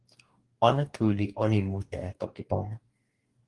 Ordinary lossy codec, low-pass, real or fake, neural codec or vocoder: Opus, 24 kbps; 10.8 kHz; fake; codec, 44.1 kHz, 2.6 kbps, DAC